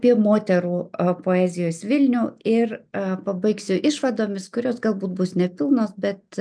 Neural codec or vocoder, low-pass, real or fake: none; 9.9 kHz; real